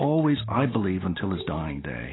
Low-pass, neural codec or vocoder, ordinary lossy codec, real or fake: 7.2 kHz; none; AAC, 16 kbps; real